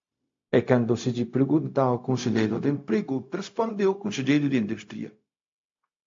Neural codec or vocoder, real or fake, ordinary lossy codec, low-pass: codec, 16 kHz, 0.4 kbps, LongCat-Audio-Codec; fake; AAC, 48 kbps; 7.2 kHz